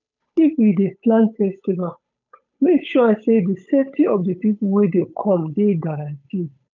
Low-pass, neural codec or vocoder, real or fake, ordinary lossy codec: 7.2 kHz; codec, 16 kHz, 8 kbps, FunCodec, trained on Chinese and English, 25 frames a second; fake; none